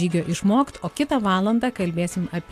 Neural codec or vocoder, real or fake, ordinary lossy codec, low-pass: none; real; AAC, 64 kbps; 14.4 kHz